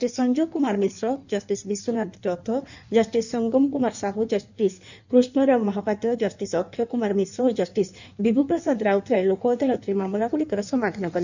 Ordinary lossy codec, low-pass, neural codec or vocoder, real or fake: none; 7.2 kHz; codec, 16 kHz in and 24 kHz out, 1.1 kbps, FireRedTTS-2 codec; fake